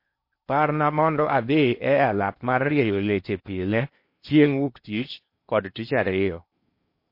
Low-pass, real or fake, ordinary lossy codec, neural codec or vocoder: 5.4 kHz; fake; MP3, 32 kbps; codec, 16 kHz in and 24 kHz out, 0.8 kbps, FocalCodec, streaming, 65536 codes